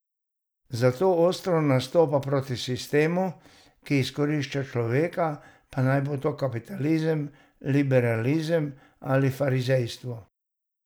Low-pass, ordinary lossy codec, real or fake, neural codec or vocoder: none; none; real; none